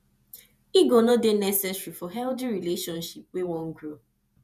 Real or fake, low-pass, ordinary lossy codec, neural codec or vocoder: fake; 14.4 kHz; none; vocoder, 48 kHz, 128 mel bands, Vocos